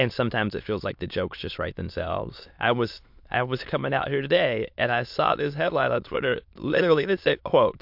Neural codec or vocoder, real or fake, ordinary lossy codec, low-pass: autoencoder, 22.05 kHz, a latent of 192 numbers a frame, VITS, trained on many speakers; fake; MP3, 48 kbps; 5.4 kHz